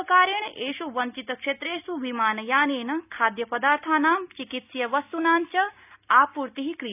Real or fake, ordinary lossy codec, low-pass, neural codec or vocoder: real; none; 3.6 kHz; none